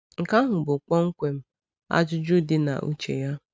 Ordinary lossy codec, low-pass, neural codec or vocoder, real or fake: none; none; none; real